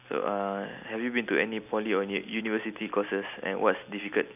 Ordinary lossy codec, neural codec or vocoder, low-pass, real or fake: none; none; 3.6 kHz; real